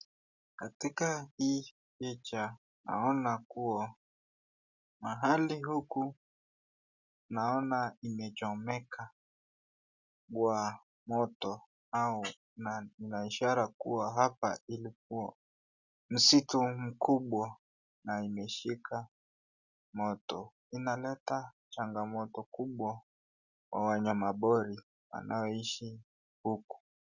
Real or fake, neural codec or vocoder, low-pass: real; none; 7.2 kHz